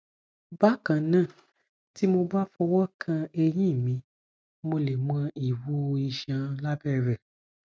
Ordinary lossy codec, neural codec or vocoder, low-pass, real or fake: none; none; none; real